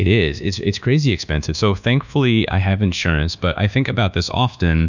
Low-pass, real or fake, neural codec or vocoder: 7.2 kHz; fake; codec, 24 kHz, 1.2 kbps, DualCodec